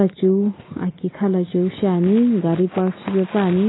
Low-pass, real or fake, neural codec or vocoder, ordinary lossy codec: 7.2 kHz; real; none; AAC, 16 kbps